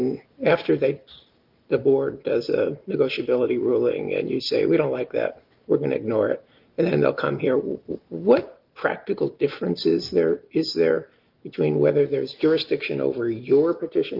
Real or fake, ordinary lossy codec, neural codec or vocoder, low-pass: real; Opus, 32 kbps; none; 5.4 kHz